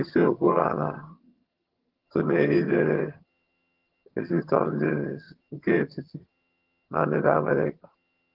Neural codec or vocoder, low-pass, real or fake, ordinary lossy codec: vocoder, 22.05 kHz, 80 mel bands, HiFi-GAN; 5.4 kHz; fake; Opus, 16 kbps